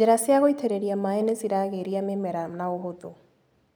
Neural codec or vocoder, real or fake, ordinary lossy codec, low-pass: none; real; none; none